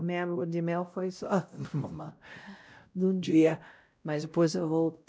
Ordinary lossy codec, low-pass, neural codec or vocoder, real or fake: none; none; codec, 16 kHz, 0.5 kbps, X-Codec, WavLM features, trained on Multilingual LibriSpeech; fake